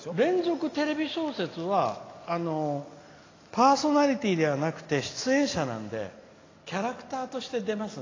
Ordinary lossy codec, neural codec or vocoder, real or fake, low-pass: AAC, 32 kbps; none; real; 7.2 kHz